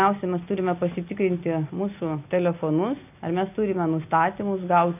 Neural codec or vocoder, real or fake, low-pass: none; real; 3.6 kHz